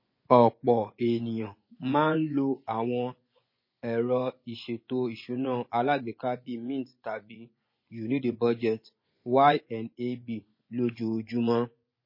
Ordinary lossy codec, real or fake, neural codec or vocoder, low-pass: MP3, 24 kbps; fake; vocoder, 24 kHz, 100 mel bands, Vocos; 5.4 kHz